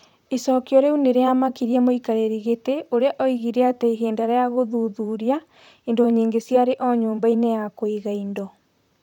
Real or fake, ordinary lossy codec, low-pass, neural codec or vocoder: fake; none; 19.8 kHz; vocoder, 44.1 kHz, 128 mel bands, Pupu-Vocoder